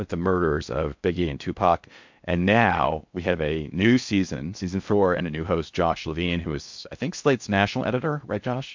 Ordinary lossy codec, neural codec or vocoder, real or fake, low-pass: MP3, 64 kbps; codec, 16 kHz in and 24 kHz out, 0.6 kbps, FocalCodec, streaming, 4096 codes; fake; 7.2 kHz